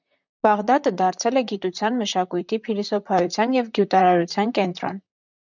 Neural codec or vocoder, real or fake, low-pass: vocoder, 44.1 kHz, 128 mel bands, Pupu-Vocoder; fake; 7.2 kHz